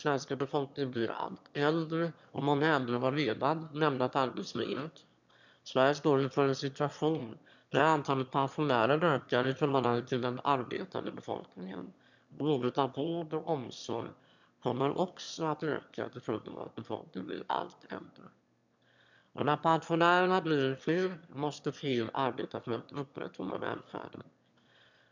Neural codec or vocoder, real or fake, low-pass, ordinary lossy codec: autoencoder, 22.05 kHz, a latent of 192 numbers a frame, VITS, trained on one speaker; fake; 7.2 kHz; none